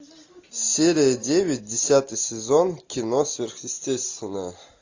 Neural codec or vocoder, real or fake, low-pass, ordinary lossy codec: none; real; 7.2 kHz; AAC, 48 kbps